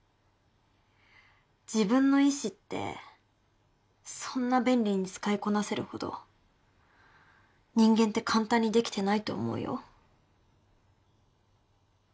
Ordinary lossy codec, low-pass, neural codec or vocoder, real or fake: none; none; none; real